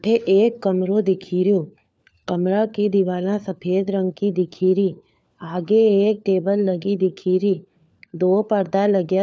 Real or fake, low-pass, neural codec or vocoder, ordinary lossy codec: fake; none; codec, 16 kHz, 4 kbps, FunCodec, trained on LibriTTS, 50 frames a second; none